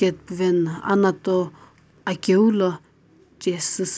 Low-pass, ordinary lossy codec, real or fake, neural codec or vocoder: none; none; real; none